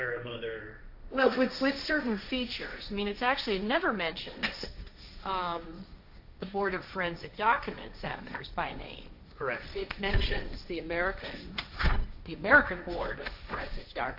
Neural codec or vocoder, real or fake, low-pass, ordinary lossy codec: codec, 16 kHz, 1.1 kbps, Voila-Tokenizer; fake; 5.4 kHz; AAC, 48 kbps